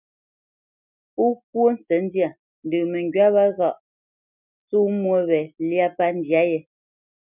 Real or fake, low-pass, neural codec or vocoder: real; 3.6 kHz; none